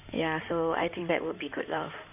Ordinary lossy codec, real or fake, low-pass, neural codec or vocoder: none; fake; 3.6 kHz; codec, 16 kHz in and 24 kHz out, 2.2 kbps, FireRedTTS-2 codec